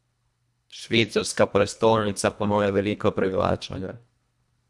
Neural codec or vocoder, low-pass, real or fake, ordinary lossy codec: codec, 24 kHz, 1.5 kbps, HILCodec; none; fake; none